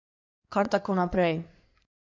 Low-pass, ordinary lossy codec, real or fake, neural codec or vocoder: 7.2 kHz; MP3, 64 kbps; fake; codec, 16 kHz in and 24 kHz out, 2.2 kbps, FireRedTTS-2 codec